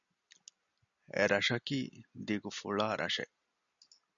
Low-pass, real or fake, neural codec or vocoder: 7.2 kHz; real; none